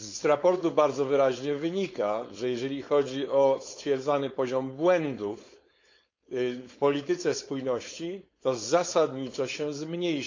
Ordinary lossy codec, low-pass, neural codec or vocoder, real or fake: MP3, 48 kbps; 7.2 kHz; codec, 16 kHz, 4.8 kbps, FACodec; fake